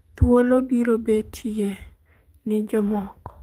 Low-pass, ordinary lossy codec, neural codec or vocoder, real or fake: 14.4 kHz; Opus, 32 kbps; codec, 32 kHz, 1.9 kbps, SNAC; fake